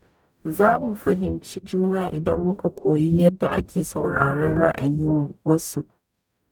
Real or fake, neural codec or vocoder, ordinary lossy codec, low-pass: fake; codec, 44.1 kHz, 0.9 kbps, DAC; none; 19.8 kHz